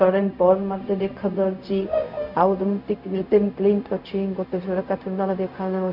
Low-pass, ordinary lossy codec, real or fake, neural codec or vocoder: 5.4 kHz; none; fake; codec, 16 kHz, 0.4 kbps, LongCat-Audio-Codec